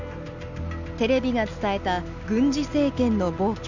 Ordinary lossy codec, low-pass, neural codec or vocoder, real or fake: none; 7.2 kHz; none; real